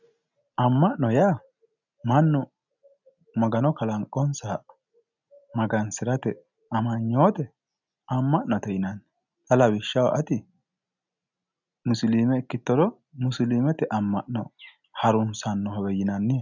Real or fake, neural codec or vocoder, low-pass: real; none; 7.2 kHz